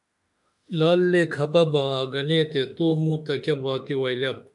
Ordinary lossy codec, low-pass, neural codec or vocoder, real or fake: MP3, 96 kbps; 10.8 kHz; autoencoder, 48 kHz, 32 numbers a frame, DAC-VAE, trained on Japanese speech; fake